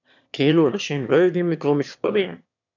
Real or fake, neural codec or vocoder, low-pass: fake; autoencoder, 22.05 kHz, a latent of 192 numbers a frame, VITS, trained on one speaker; 7.2 kHz